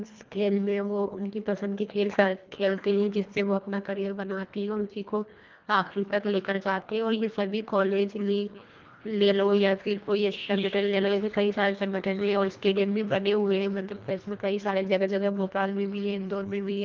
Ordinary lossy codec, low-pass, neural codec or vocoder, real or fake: Opus, 24 kbps; 7.2 kHz; codec, 24 kHz, 1.5 kbps, HILCodec; fake